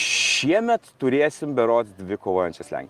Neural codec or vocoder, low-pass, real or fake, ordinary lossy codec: none; 14.4 kHz; real; Opus, 32 kbps